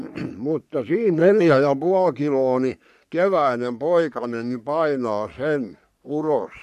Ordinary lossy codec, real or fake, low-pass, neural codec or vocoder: none; fake; 14.4 kHz; codec, 44.1 kHz, 3.4 kbps, Pupu-Codec